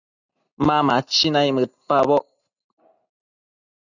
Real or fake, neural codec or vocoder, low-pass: real; none; 7.2 kHz